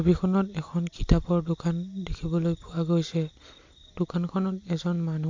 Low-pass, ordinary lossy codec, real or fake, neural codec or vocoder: 7.2 kHz; MP3, 48 kbps; real; none